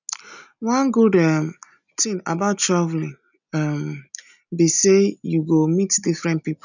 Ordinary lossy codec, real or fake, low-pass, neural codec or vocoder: none; real; 7.2 kHz; none